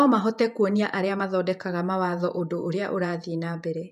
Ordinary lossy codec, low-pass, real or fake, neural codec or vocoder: none; 14.4 kHz; fake; vocoder, 48 kHz, 128 mel bands, Vocos